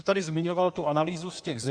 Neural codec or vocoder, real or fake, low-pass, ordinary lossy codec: codec, 16 kHz in and 24 kHz out, 1.1 kbps, FireRedTTS-2 codec; fake; 9.9 kHz; AAC, 64 kbps